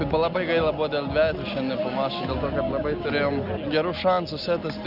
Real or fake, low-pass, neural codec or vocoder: real; 5.4 kHz; none